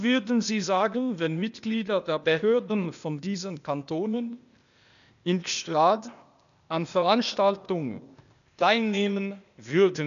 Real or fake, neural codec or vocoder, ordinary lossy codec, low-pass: fake; codec, 16 kHz, 0.8 kbps, ZipCodec; none; 7.2 kHz